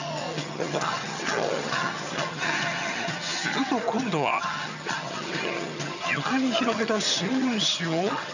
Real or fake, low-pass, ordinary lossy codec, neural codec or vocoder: fake; 7.2 kHz; none; vocoder, 22.05 kHz, 80 mel bands, HiFi-GAN